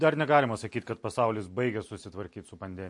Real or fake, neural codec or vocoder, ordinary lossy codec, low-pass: real; none; MP3, 48 kbps; 10.8 kHz